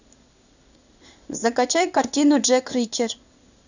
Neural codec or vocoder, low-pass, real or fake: codec, 16 kHz in and 24 kHz out, 1 kbps, XY-Tokenizer; 7.2 kHz; fake